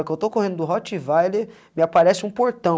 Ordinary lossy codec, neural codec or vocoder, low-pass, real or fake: none; none; none; real